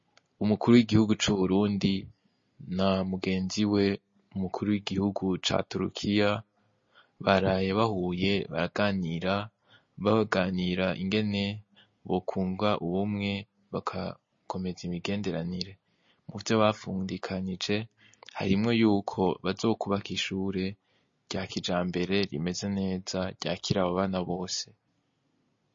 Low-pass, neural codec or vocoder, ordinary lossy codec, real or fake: 7.2 kHz; none; MP3, 32 kbps; real